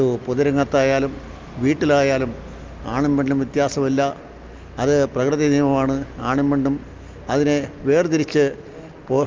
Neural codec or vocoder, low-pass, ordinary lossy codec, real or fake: none; 7.2 kHz; Opus, 24 kbps; real